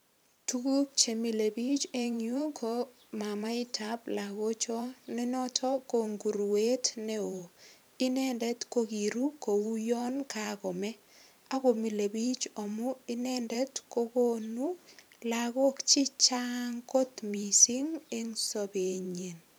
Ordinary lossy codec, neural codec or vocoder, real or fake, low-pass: none; vocoder, 44.1 kHz, 128 mel bands every 512 samples, BigVGAN v2; fake; none